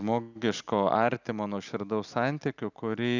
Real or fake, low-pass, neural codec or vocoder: real; 7.2 kHz; none